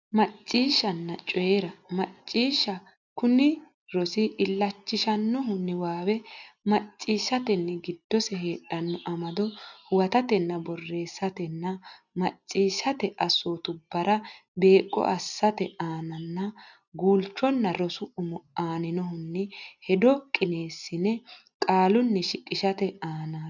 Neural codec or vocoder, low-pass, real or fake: none; 7.2 kHz; real